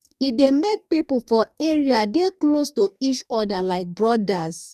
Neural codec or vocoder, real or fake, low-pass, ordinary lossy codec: codec, 44.1 kHz, 2.6 kbps, DAC; fake; 14.4 kHz; none